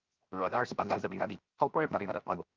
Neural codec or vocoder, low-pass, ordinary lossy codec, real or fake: codec, 16 kHz, 0.7 kbps, FocalCodec; 7.2 kHz; Opus, 32 kbps; fake